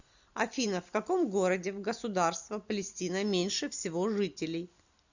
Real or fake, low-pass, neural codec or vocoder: real; 7.2 kHz; none